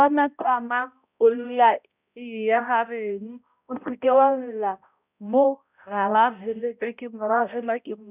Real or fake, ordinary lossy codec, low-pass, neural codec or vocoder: fake; none; 3.6 kHz; codec, 16 kHz, 0.5 kbps, X-Codec, HuBERT features, trained on balanced general audio